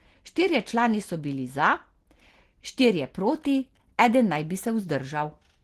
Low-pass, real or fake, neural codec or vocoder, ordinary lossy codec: 14.4 kHz; real; none; Opus, 16 kbps